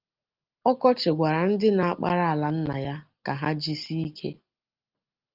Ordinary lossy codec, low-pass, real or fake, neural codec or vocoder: Opus, 32 kbps; 5.4 kHz; real; none